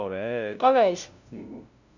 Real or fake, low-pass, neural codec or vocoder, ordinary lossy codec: fake; 7.2 kHz; codec, 16 kHz, 0.5 kbps, FunCodec, trained on LibriTTS, 25 frames a second; none